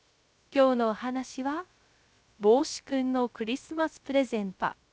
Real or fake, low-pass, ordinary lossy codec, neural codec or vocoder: fake; none; none; codec, 16 kHz, 0.3 kbps, FocalCodec